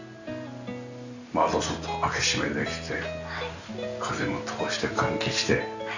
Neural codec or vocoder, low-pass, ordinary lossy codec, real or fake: none; 7.2 kHz; none; real